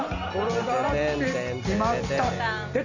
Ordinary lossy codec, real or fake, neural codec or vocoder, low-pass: none; real; none; 7.2 kHz